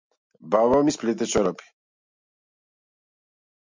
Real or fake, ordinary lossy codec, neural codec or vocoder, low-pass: real; MP3, 64 kbps; none; 7.2 kHz